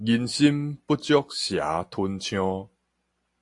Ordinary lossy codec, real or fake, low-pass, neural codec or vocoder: AAC, 64 kbps; real; 10.8 kHz; none